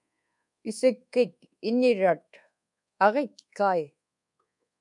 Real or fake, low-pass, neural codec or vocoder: fake; 10.8 kHz; codec, 24 kHz, 1.2 kbps, DualCodec